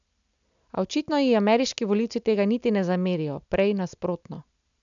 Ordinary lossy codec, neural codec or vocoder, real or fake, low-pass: none; none; real; 7.2 kHz